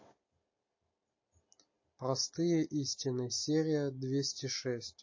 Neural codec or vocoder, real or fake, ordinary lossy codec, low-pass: none; real; MP3, 32 kbps; 7.2 kHz